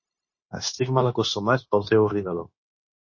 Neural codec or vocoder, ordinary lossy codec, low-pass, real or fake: codec, 16 kHz, 0.9 kbps, LongCat-Audio-Codec; MP3, 32 kbps; 7.2 kHz; fake